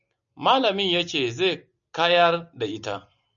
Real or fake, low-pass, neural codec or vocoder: real; 7.2 kHz; none